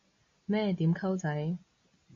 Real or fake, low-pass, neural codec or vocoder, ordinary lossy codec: real; 7.2 kHz; none; MP3, 32 kbps